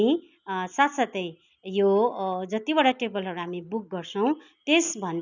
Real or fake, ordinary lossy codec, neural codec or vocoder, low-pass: real; none; none; 7.2 kHz